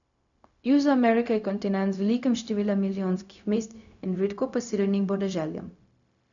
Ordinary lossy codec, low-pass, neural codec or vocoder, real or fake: none; 7.2 kHz; codec, 16 kHz, 0.4 kbps, LongCat-Audio-Codec; fake